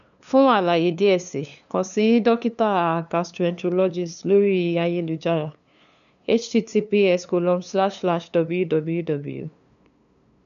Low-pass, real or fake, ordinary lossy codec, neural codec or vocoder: 7.2 kHz; fake; none; codec, 16 kHz, 2 kbps, FunCodec, trained on LibriTTS, 25 frames a second